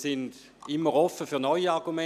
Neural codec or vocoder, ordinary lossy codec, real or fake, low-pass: none; none; real; 14.4 kHz